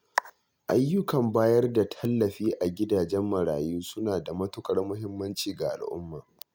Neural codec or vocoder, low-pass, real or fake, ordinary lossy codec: none; none; real; none